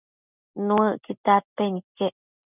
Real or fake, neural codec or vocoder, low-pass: real; none; 3.6 kHz